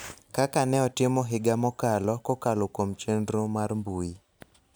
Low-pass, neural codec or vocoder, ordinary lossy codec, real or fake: none; none; none; real